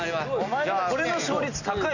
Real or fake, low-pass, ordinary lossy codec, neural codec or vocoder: real; 7.2 kHz; none; none